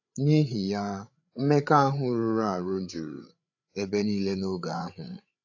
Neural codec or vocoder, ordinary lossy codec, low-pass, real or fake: codec, 16 kHz, 8 kbps, FreqCodec, larger model; AAC, 48 kbps; 7.2 kHz; fake